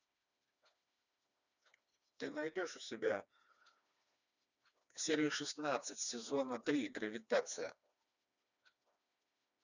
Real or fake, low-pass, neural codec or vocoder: fake; 7.2 kHz; codec, 16 kHz, 2 kbps, FreqCodec, smaller model